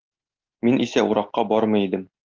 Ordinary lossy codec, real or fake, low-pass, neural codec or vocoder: Opus, 32 kbps; real; 7.2 kHz; none